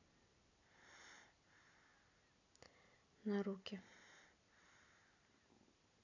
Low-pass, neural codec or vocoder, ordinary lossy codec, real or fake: 7.2 kHz; none; none; real